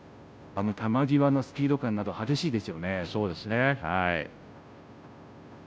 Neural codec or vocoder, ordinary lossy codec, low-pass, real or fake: codec, 16 kHz, 0.5 kbps, FunCodec, trained on Chinese and English, 25 frames a second; none; none; fake